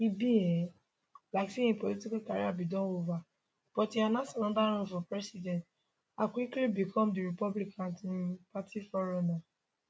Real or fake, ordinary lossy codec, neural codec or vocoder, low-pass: real; none; none; none